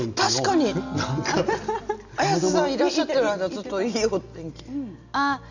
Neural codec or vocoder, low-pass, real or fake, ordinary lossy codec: none; 7.2 kHz; real; none